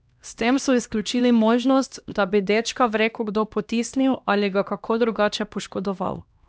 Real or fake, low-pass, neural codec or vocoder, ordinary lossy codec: fake; none; codec, 16 kHz, 1 kbps, X-Codec, HuBERT features, trained on LibriSpeech; none